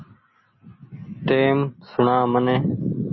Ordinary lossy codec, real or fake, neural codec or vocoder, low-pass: MP3, 24 kbps; real; none; 7.2 kHz